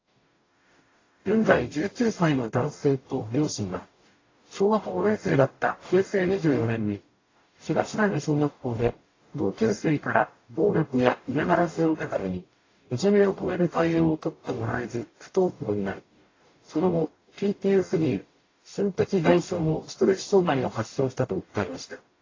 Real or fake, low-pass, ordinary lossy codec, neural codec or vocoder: fake; 7.2 kHz; AAC, 32 kbps; codec, 44.1 kHz, 0.9 kbps, DAC